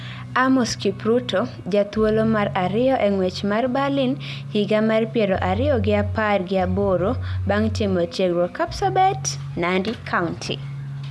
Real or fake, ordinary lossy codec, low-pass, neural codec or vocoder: real; none; none; none